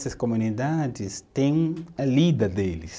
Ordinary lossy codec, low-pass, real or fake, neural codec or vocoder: none; none; real; none